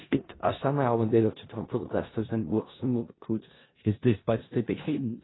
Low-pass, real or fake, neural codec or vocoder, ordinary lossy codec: 7.2 kHz; fake; codec, 16 kHz in and 24 kHz out, 0.4 kbps, LongCat-Audio-Codec, four codebook decoder; AAC, 16 kbps